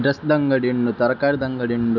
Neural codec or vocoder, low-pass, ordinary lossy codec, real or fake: none; 7.2 kHz; none; real